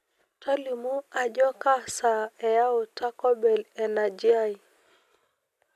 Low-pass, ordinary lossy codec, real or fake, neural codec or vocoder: 14.4 kHz; none; real; none